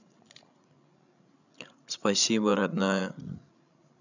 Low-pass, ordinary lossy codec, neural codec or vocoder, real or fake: 7.2 kHz; none; codec, 16 kHz, 16 kbps, FreqCodec, larger model; fake